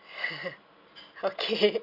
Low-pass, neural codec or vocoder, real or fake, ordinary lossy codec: 5.4 kHz; none; real; none